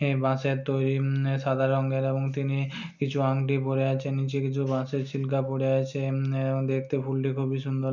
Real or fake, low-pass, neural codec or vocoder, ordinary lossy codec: real; 7.2 kHz; none; none